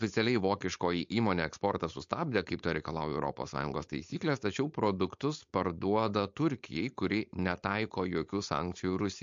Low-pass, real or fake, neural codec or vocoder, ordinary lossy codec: 7.2 kHz; fake; codec, 16 kHz, 4.8 kbps, FACodec; MP3, 48 kbps